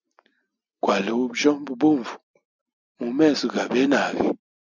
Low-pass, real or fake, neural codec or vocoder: 7.2 kHz; real; none